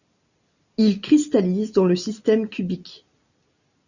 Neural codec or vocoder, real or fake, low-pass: none; real; 7.2 kHz